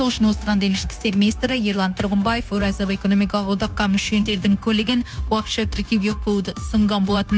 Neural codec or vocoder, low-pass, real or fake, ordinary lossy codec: codec, 16 kHz, 0.9 kbps, LongCat-Audio-Codec; none; fake; none